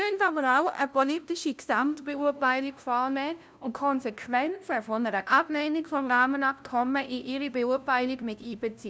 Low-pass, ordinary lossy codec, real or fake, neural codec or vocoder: none; none; fake; codec, 16 kHz, 0.5 kbps, FunCodec, trained on LibriTTS, 25 frames a second